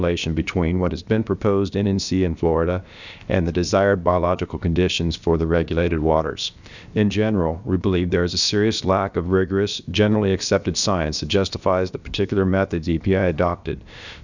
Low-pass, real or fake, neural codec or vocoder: 7.2 kHz; fake; codec, 16 kHz, about 1 kbps, DyCAST, with the encoder's durations